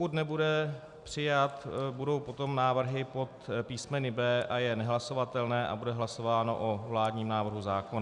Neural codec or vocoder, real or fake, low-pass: none; real; 10.8 kHz